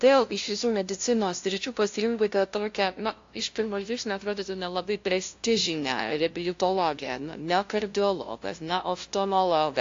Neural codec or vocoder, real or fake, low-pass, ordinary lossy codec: codec, 16 kHz, 0.5 kbps, FunCodec, trained on LibriTTS, 25 frames a second; fake; 7.2 kHz; AAC, 48 kbps